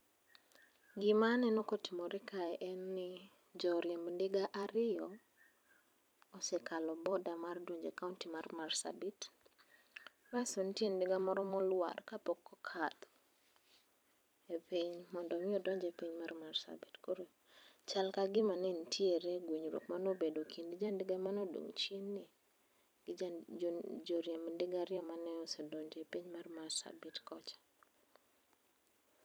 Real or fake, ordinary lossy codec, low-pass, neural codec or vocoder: fake; none; none; vocoder, 44.1 kHz, 128 mel bands every 256 samples, BigVGAN v2